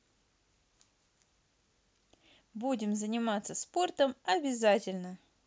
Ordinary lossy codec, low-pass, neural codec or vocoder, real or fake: none; none; none; real